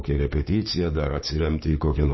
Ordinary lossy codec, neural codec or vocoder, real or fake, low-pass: MP3, 24 kbps; codec, 16 kHz, 6 kbps, DAC; fake; 7.2 kHz